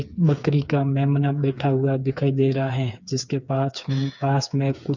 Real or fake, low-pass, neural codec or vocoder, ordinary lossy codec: fake; 7.2 kHz; codec, 16 kHz, 4 kbps, FreqCodec, smaller model; none